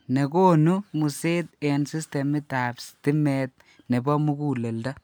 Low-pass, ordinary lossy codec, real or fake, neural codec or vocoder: none; none; real; none